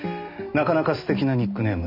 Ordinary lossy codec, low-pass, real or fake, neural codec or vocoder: none; 5.4 kHz; real; none